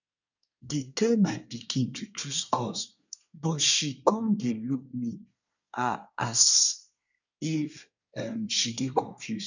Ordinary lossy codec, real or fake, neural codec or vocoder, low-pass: none; fake; codec, 24 kHz, 1 kbps, SNAC; 7.2 kHz